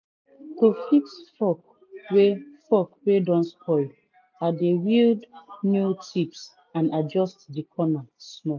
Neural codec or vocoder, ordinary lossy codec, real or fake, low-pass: none; none; real; 7.2 kHz